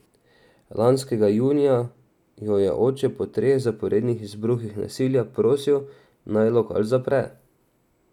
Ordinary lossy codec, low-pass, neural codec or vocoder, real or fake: none; 19.8 kHz; none; real